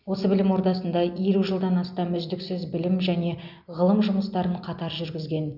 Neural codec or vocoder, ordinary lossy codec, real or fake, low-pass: none; none; real; 5.4 kHz